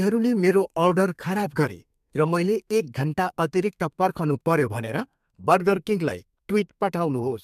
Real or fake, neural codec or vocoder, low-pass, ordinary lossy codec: fake; codec, 32 kHz, 1.9 kbps, SNAC; 14.4 kHz; MP3, 96 kbps